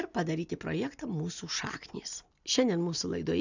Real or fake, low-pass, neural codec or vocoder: real; 7.2 kHz; none